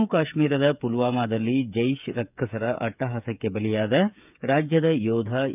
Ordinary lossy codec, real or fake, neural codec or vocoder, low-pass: none; fake; codec, 16 kHz, 8 kbps, FreqCodec, smaller model; 3.6 kHz